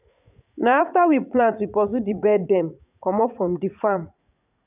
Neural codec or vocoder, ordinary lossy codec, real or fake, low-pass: vocoder, 44.1 kHz, 80 mel bands, Vocos; none; fake; 3.6 kHz